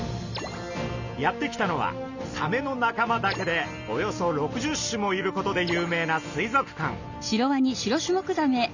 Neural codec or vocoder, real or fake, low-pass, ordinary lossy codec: none; real; 7.2 kHz; none